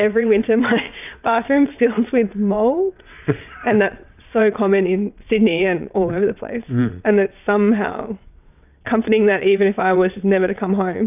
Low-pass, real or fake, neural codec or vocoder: 3.6 kHz; fake; vocoder, 22.05 kHz, 80 mel bands, WaveNeXt